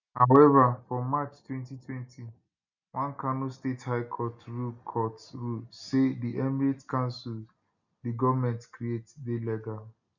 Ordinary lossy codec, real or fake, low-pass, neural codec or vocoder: none; real; 7.2 kHz; none